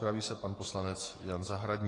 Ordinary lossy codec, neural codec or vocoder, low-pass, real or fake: AAC, 32 kbps; codec, 44.1 kHz, 7.8 kbps, DAC; 10.8 kHz; fake